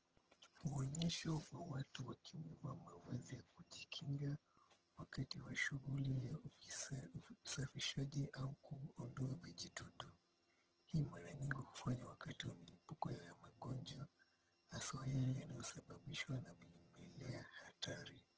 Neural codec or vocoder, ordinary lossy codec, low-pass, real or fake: vocoder, 22.05 kHz, 80 mel bands, HiFi-GAN; Opus, 16 kbps; 7.2 kHz; fake